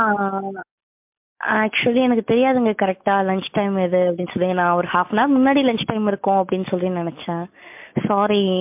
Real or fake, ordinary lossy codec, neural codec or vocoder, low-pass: real; MP3, 32 kbps; none; 3.6 kHz